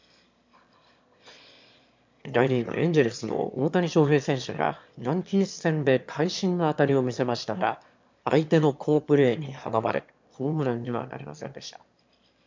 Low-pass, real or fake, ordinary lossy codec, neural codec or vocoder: 7.2 kHz; fake; MP3, 64 kbps; autoencoder, 22.05 kHz, a latent of 192 numbers a frame, VITS, trained on one speaker